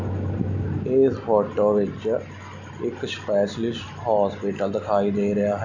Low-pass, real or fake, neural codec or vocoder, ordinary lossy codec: 7.2 kHz; real; none; none